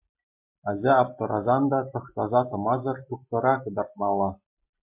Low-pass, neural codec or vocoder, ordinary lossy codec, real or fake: 3.6 kHz; none; MP3, 32 kbps; real